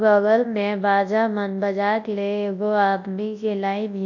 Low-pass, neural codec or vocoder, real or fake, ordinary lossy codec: 7.2 kHz; codec, 24 kHz, 0.9 kbps, WavTokenizer, large speech release; fake; AAC, 48 kbps